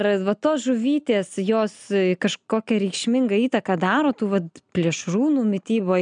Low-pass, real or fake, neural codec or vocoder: 9.9 kHz; real; none